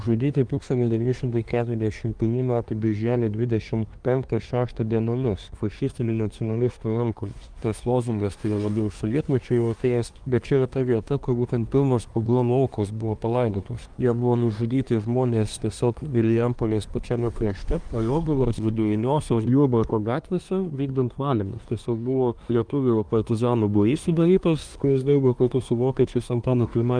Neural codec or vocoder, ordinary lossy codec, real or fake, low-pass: codec, 24 kHz, 1 kbps, SNAC; Opus, 32 kbps; fake; 9.9 kHz